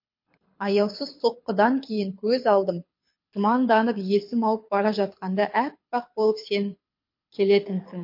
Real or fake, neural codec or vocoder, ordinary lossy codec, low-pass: fake; codec, 24 kHz, 6 kbps, HILCodec; MP3, 32 kbps; 5.4 kHz